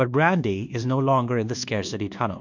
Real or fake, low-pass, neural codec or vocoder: fake; 7.2 kHz; codec, 24 kHz, 1.2 kbps, DualCodec